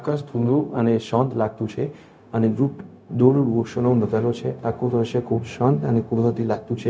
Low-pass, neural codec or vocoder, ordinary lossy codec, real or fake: none; codec, 16 kHz, 0.4 kbps, LongCat-Audio-Codec; none; fake